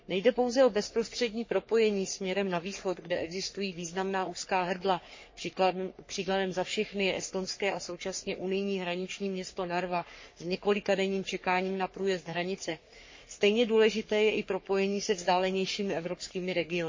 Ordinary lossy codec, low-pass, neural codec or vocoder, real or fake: MP3, 32 kbps; 7.2 kHz; codec, 44.1 kHz, 3.4 kbps, Pupu-Codec; fake